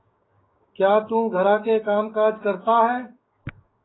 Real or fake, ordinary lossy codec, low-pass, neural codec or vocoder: fake; AAC, 16 kbps; 7.2 kHz; codec, 16 kHz, 6 kbps, DAC